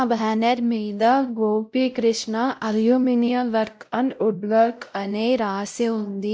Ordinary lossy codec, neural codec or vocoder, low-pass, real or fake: none; codec, 16 kHz, 0.5 kbps, X-Codec, WavLM features, trained on Multilingual LibriSpeech; none; fake